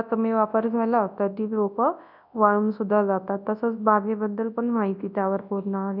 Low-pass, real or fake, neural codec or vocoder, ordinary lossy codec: 5.4 kHz; fake; codec, 24 kHz, 0.9 kbps, WavTokenizer, large speech release; none